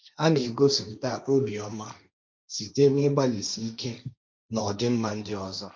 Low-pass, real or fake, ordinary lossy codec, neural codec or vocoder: none; fake; none; codec, 16 kHz, 1.1 kbps, Voila-Tokenizer